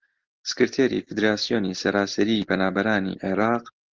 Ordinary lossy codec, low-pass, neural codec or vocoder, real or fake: Opus, 16 kbps; 7.2 kHz; none; real